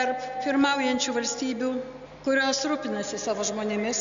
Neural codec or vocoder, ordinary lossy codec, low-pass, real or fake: none; MP3, 64 kbps; 7.2 kHz; real